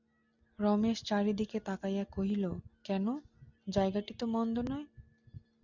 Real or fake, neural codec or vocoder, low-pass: real; none; 7.2 kHz